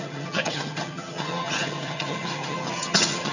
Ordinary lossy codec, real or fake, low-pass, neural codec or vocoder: none; fake; 7.2 kHz; vocoder, 22.05 kHz, 80 mel bands, HiFi-GAN